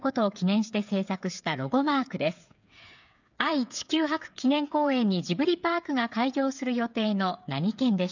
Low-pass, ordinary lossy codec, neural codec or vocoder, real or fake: 7.2 kHz; none; codec, 16 kHz, 8 kbps, FreqCodec, smaller model; fake